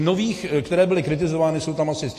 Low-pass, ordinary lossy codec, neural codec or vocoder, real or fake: 14.4 kHz; AAC, 48 kbps; none; real